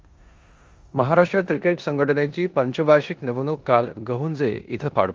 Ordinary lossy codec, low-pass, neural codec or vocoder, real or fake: Opus, 32 kbps; 7.2 kHz; codec, 16 kHz in and 24 kHz out, 0.9 kbps, LongCat-Audio-Codec, four codebook decoder; fake